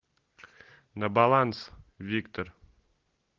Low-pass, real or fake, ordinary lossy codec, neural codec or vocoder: 7.2 kHz; real; Opus, 16 kbps; none